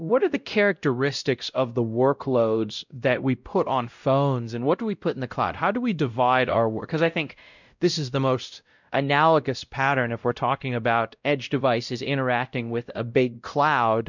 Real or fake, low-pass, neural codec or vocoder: fake; 7.2 kHz; codec, 16 kHz, 0.5 kbps, X-Codec, WavLM features, trained on Multilingual LibriSpeech